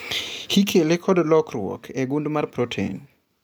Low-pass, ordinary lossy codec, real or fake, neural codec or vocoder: none; none; real; none